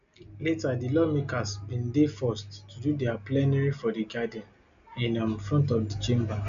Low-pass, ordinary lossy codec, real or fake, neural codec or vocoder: 7.2 kHz; none; real; none